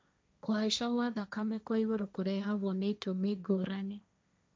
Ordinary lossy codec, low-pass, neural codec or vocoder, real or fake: none; none; codec, 16 kHz, 1.1 kbps, Voila-Tokenizer; fake